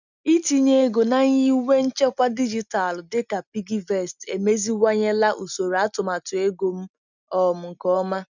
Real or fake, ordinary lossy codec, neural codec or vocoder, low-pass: real; none; none; 7.2 kHz